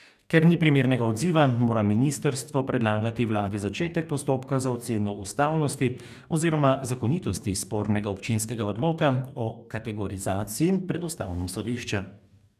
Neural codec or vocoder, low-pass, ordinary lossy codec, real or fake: codec, 44.1 kHz, 2.6 kbps, DAC; 14.4 kHz; none; fake